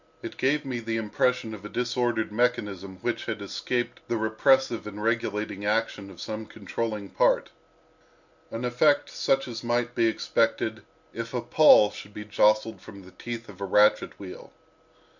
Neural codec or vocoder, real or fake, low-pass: none; real; 7.2 kHz